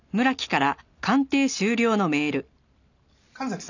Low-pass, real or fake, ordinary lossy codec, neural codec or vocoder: 7.2 kHz; real; none; none